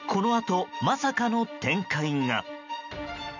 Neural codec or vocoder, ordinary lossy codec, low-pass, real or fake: none; none; 7.2 kHz; real